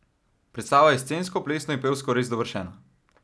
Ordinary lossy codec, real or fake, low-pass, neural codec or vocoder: none; real; none; none